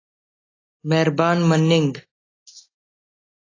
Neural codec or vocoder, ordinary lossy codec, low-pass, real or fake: none; AAC, 32 kbps; 7.2 kHz; real